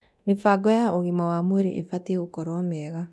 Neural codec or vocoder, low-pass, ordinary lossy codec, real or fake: codec, 24 kHz, 0.9 kbps, DualCodec; none; none; fake